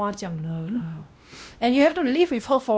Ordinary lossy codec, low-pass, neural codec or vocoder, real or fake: none; none; codec, 16 kHz, 1 kbps, X-Codec, WavLM features, trained on Multilingual LibriSpeech; fake